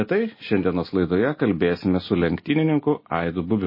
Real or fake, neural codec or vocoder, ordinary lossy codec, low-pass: real; none; MP3, 24 kbps; 5.4 kHz